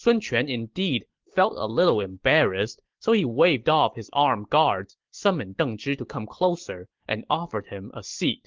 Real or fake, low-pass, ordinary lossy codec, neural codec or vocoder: real; 7.2 kHz; Opus, 16 kbps; none